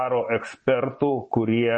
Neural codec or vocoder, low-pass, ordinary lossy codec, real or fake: vocoder, 24 kHz, 100 mel bands, Vocos; 9.9 kHz; MP3, 32 kbps; fake